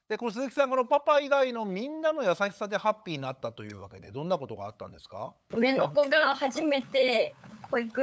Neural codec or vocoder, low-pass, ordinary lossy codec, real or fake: codec, 16 kHz, 8 kbps, FunCodec, trained on LibriTTS, 25 frames a second; none; none; fake